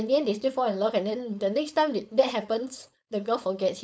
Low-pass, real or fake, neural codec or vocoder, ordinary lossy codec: none; fake; codec, 16 kHz, 4.8 kbps, FACodec; none